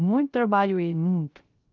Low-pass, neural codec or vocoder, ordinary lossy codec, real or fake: 7.2 kHz; codec, 16 kHz, 0.3 kbps, FocalCodec; Opus, 32 kbps; fake